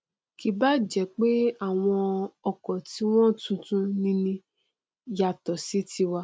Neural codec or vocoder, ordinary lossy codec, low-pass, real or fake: none; none; none; real